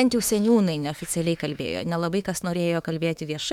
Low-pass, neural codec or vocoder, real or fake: 19.8 kHz; autoencoder, 48 kHz, 32 numbers a frame, DAC-VAE, trained on Japanese speech; fake